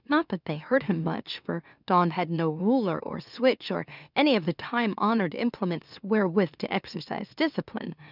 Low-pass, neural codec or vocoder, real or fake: 5.4 kHz; autoencoder, 44.1 kHz, a latent of 192 numbers a frame, MeloTTS; fake